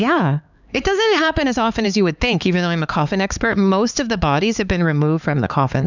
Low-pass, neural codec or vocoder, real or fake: 7.2 kHz; codec, 16 kHz, 4 kbps, X-Codec, HuBERT features, trained on LibriSpeech; fake